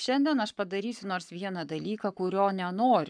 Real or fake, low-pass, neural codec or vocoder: fake; 9.9 kHz; codec, 44.1 kHz, 7.8 kbps, Pupu-Codec